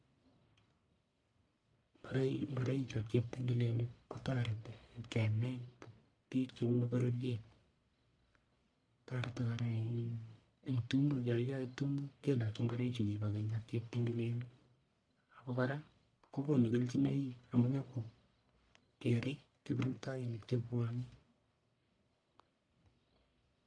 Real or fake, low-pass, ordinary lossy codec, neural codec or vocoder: fake; 9.9 kHz; MP3, 64 kbps; codec, 44.1 kHz, 1.7 kbps, Pupu-Codec